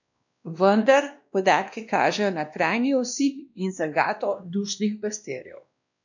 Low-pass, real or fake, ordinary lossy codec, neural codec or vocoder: 7.2 kHz; fake; none; codec, 16 kHz, 1 kbps, X-Codec, WavLM features, trained on Multilingual LibriSpeech